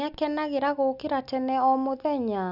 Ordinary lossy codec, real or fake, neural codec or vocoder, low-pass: none; real; none; 5.4 kHz